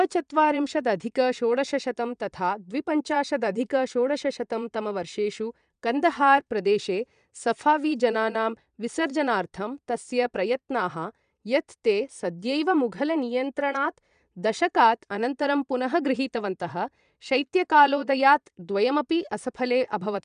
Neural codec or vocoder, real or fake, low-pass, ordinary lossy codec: vocoder, 22.05 kHz, 80 mel bands, Vocos; fake; 9.9 kHz; none